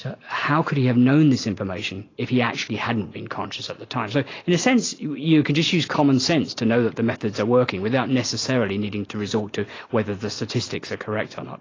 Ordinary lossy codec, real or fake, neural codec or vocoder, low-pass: AAC, 32 kbps; real; none; 7.2 kHz